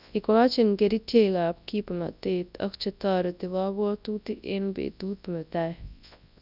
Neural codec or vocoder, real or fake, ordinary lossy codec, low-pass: codec, 24 kHz, 0.9 kbps, WavTokenizer, large speech release; fake; none; 5.4 kHz